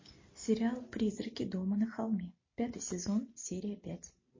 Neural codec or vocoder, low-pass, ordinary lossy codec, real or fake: none; 7.2 kHz; MP3, 32 kbps; real